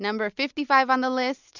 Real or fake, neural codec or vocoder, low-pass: real; none; 7.2 kHz